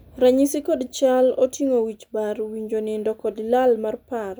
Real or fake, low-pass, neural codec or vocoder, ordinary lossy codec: real; none; none; none